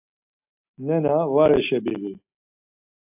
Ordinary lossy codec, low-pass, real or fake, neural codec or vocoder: AAC, 32 kbps; 3.6 kHz; real; none